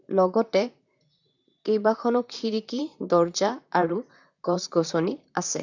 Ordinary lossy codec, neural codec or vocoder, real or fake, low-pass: none; vocoder, 44.1 kHz, 128 mel bands, Pupu-Vocoder; fake; 7.2 kHz